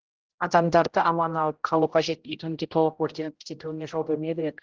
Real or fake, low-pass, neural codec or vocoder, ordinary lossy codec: fake; 7.2 kHz; codec, 16 kHz, 0.5 kbps, X-Codec, HuBERT features, trained on general audio; Opus, 16 kbps